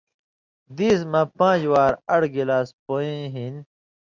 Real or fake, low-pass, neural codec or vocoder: real; 7.2 kHz; none